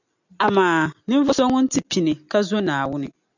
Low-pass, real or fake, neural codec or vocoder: 7.2 kHz; real; none